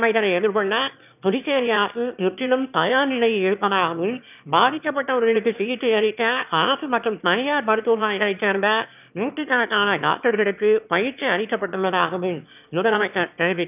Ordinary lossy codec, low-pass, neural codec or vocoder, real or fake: none; 3.6 kHz; autoencoder, 22.05 kHz, a latent of 192 numbers a frame, VITS, trained on one speaker; fake